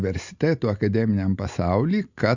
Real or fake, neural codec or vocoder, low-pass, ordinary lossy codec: real; none; 7.2 kHz; Opus, 64 kbps